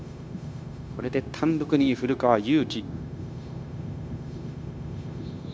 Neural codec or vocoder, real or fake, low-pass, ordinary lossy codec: codec, 16 kHz, 0.9 kbps, LongCat-Audio-Codec; fake; none; none